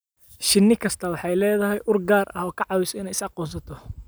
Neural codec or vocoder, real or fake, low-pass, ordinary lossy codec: none; real; none; none